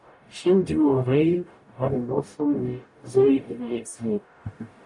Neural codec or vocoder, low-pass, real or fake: codec, 44.1 kHz, 0.9 kbps, DAC; 10.8 kHz; fake